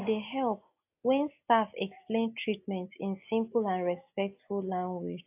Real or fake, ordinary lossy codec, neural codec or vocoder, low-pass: real; AAC, 32 kbps; none; 3.6 kHz